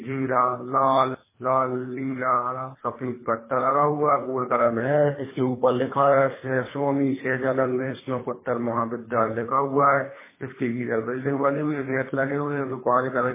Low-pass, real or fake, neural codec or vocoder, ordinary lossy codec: 3.6 kHz; fake; codec, 24 kHz, 3 kbps, HILCodec; MP3, 16 kbps